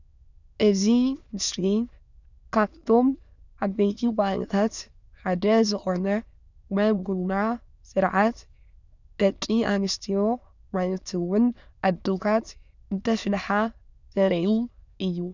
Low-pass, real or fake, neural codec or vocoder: 7.2 kHz; fake; autoencoder, 22.05 kHz, a latent of 192 numbers a frame, VITS, trained on many speakers